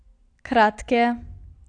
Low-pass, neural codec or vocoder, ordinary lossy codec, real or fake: 9.9 kHz; none; AAC, 48 kbps; real